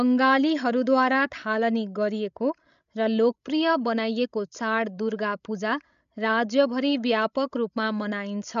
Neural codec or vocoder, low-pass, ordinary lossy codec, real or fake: codec, 16 kHz, 16 kbps, FreqCodec, larger model; 7.2 kHz; AAC, 96 kbps; fake